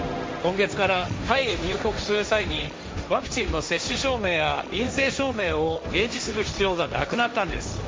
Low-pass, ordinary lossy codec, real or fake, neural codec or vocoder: none; none; fake; codec, 16 kHz, 1.1 kbps, Voila-Tokenizer